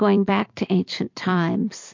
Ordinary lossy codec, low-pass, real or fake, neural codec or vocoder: MP3, 48 kbps; 7.2 kHz; fake; vocoder, 44.1 kHz, 128 mel bands every 256 samples, BigVGAN v2